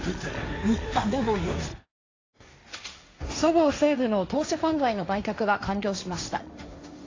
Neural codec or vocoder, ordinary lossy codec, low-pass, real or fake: codec, 16 kHz, 1.1 kbps, Voila-Tokenizer; AAC, 32 kbps; 7.2 kHz; fake